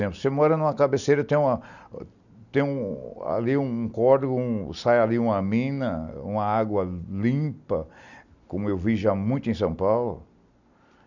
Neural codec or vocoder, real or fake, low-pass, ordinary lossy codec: none; real; 7.2 kHz; none